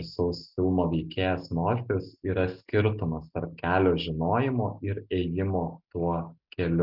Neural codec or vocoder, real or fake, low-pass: none; real; 5.4 kHz